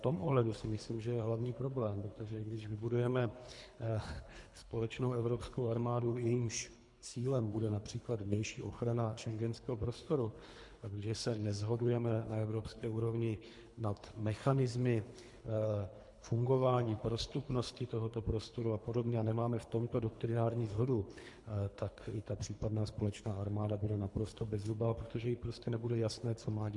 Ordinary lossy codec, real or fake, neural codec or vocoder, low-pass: MP3, 64 kbps; fake; codec, 24 kHz, 3 kbps, HILCodec; 10.8 kHz